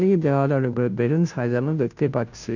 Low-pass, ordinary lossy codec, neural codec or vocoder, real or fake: 7.2 kHz; none; codec, 16 kHz, 0.5 kbps, FunCodec, trained on Chinese and English, 25 frames a second; fake